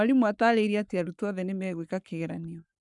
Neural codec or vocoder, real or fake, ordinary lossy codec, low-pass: codec, 44.1 kHz, 7.8 kbps, Pupu-Codec; fake; none; 10.8 kHz